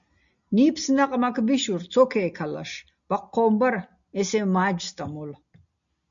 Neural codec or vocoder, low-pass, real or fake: none; 7.2 kHz; real